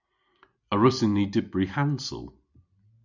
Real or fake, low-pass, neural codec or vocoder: real; 7.2 kHz; none